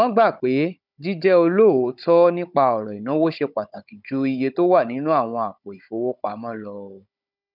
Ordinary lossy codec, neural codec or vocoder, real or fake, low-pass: none; codec, 16 kHz, 16 kbps, FunCodec, trained on Chinese and English, 50 frames a second; fake; 5.4 kHz